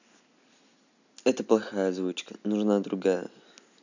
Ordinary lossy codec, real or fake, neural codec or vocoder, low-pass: none; real; none; 7.2 kHz